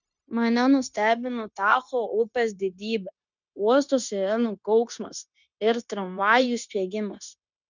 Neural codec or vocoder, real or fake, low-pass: codec, 16 kHz, 0.9 kbps, LongCat-Audio-Codec; fake; 7.2 kHz